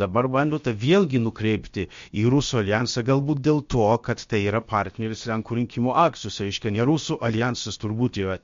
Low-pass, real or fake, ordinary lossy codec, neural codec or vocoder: 7.2 kHz; fake; MP3, 48 kbps; codec, 16 kHz, about 1 kbps, DyCAST, with the encoder's durations